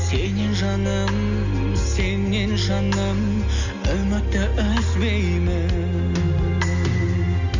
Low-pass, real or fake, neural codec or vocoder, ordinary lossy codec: 7.2 kHz; real; none; none